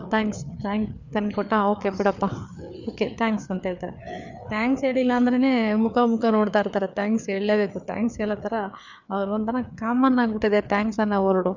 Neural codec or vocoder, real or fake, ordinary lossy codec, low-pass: codec, 16 kHz, 4 kbps, FreqCodec, larger model; fake; none; 7.2 kHz